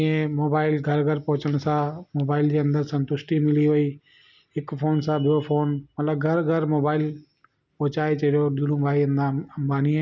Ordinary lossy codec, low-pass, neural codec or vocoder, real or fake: none; 7.2 kHz; none; real